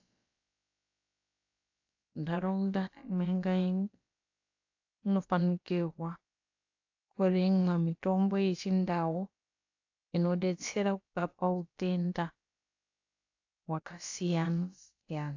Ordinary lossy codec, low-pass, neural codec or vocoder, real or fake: AAC, 48 kbps; 7.2 kHz; codec, 16 kHz, about 1 kbps, DyCAST, with the encoder's durations; fake